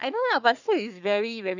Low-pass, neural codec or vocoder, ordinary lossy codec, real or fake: 7.2 kHz; codec, 44.1 kHz, 3.4 kbps, Pupu-Codec; none; fake